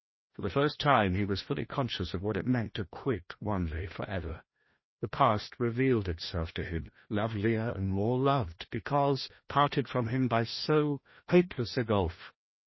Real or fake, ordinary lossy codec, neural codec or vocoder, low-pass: fake; MP3, 24 kbps; codec, 16 kHz, 1 kbps, FreqCodec, larger model; 7.2 kHz